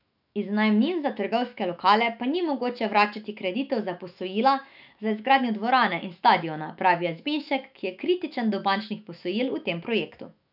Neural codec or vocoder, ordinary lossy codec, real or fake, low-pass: autoencoder, 48 kHz, 128 numbers a frame, DAC-VAE, trained on Japanese speech; none; fake; 5.4 kHz